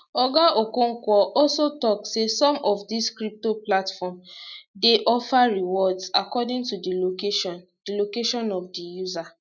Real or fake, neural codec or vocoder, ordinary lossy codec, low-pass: real; none; none; 7.2 kHz